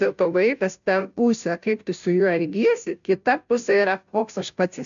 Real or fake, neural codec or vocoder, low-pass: fake; codec, 16 kHz, 0.5 kbps, FunCodec, trained on Chinese and English, 25 frames a second; 7.2 kHz